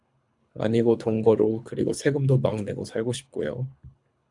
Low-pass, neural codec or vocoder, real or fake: 10.8 kHz; codec, 24 kHz, 3 kbps, HILCodec; fake